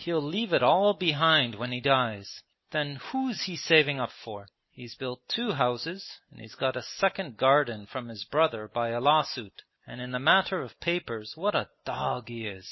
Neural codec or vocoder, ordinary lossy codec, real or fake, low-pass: none; MP3, 24 kbps; real; 7.2 kHz